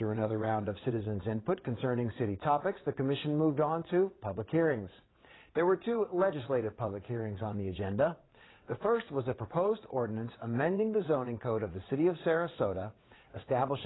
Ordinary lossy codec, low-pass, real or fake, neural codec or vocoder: AAC, 16 kbps; 7.2 kHz; fake; vocoder, 22.05 kHz, 80 mel bands, WaveNeXt